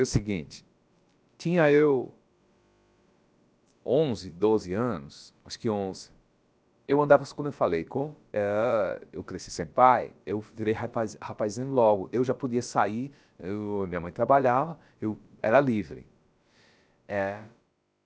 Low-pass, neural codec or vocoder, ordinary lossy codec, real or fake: none; codec, 16 kHz, about 1 kbps, DyCAST, with the encoder's durations; none; fake